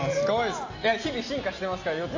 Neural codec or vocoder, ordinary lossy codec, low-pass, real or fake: none; AAC, 48 kbps; 7.2 kHz; real